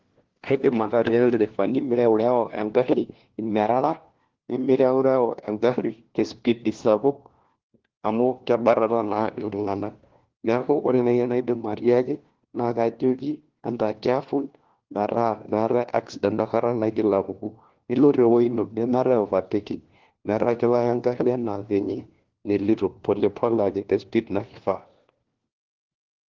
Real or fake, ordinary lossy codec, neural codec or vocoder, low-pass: fake; Opus, 16 kbps; codec, 16 kHz, 1 kbps, FunCodec, trained on LibriTTS, 50 frames a second; 7.2 kHz